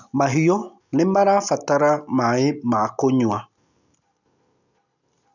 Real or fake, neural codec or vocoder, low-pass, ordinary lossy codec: real; none; 7.2 kHz; none